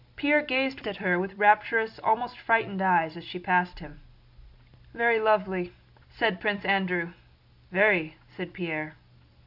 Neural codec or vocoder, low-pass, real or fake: none; 5.4 kHz; real